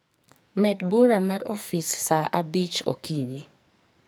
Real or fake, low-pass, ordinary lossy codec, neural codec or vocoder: fake; none; none; codec, 44.1 kHz, 2.6 kbps, SNAC